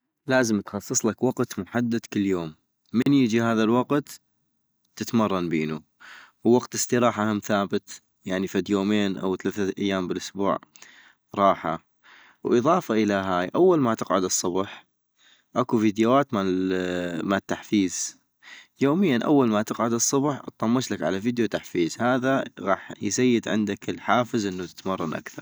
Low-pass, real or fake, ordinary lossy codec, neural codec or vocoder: none; real; none; none